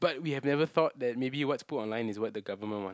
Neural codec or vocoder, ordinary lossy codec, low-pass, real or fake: none; none; none; real